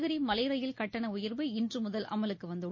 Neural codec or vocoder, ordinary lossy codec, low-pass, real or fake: none; MP3, 32 kbps; 7.2 kHz; real